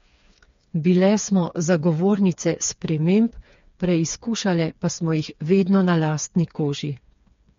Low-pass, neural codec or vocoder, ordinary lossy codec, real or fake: 7.2 kHz; codec, 16 kHz, 4 kbps, FreqCodec, smaller model; MP3, 48 kbps; fake